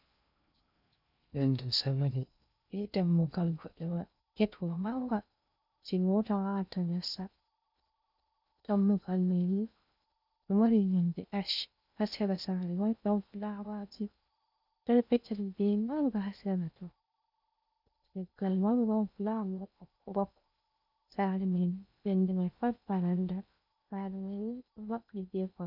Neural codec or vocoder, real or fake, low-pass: codec, 16 kHz in and 24 kHz out, 0.6 kbps, FocalCodec, streaming, 2048 codes; fake; 5.4 kHz